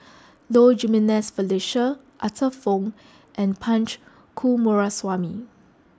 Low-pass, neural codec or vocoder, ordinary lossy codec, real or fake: none; none; none; real